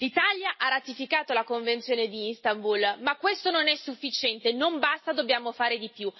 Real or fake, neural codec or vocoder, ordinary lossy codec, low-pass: real; none; MP3, 24 kbps; 7.2 kHz